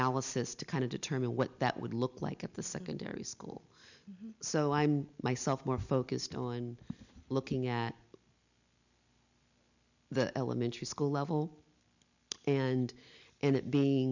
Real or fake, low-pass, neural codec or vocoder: real; 7.2 kHz; none